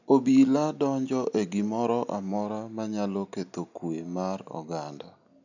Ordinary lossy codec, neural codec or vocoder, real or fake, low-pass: none; none; real; 7.2 kHz